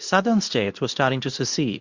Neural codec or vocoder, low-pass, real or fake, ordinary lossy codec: codec, 24 kHz, 0.9 kbps, WavTokenizer, medium speech release version 2; 7.2 kHz; fake; Opus, 64 kbps